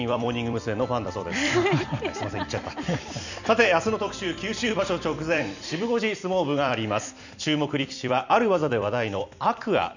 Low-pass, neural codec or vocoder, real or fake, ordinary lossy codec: 7.2 kHz; vocoder, 44.1 kHz, 128 mel bands every 256 samples, BigVGAN v2; fake; none